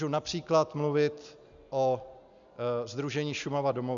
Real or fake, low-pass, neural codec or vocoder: real; 7.2 kHz; none